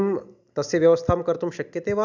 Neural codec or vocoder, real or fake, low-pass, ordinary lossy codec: none; real; 7.2 kHz; none